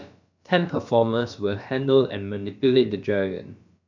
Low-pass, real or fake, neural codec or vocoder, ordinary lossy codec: 7.2 kHz; fake; codec, 16 kHz, about 1 kbps, DyCAST, with the encoder's durations; none